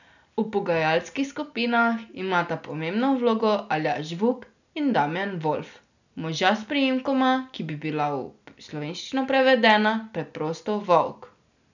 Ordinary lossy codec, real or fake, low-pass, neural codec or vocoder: none; real; 7.2 kHz; none